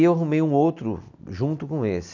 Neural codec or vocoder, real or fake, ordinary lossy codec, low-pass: none; real; none; 7.2 kHz